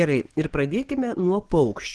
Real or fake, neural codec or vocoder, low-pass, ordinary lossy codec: fake; codec, 44.1 kHz, 7.8 kbps, DAC; 10.8 kHz; Opus, 16 kbps